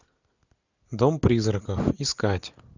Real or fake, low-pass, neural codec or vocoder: real; 7.2 kHz; none